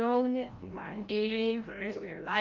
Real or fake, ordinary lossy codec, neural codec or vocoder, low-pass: fake; Opus, 32 kbps; codec, 16 kHz, 0.5 kbps, FreqCodec, larger model; 7.2 kHz